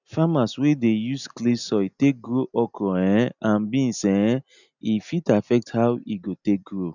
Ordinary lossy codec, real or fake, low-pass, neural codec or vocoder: none; real; 7.2 kHz; none